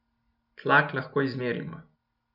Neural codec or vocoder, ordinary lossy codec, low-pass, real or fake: none; none; 5.4 kHz; real